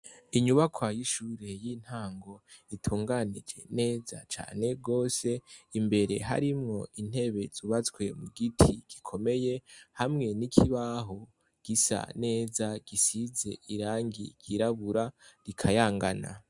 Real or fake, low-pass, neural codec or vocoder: real; 10.8 kHz; none